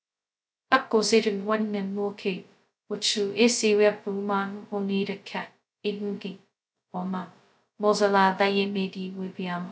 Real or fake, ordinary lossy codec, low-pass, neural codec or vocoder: fake; none; none; codec, 16 kHz, 0.2 kbps, FocalCodec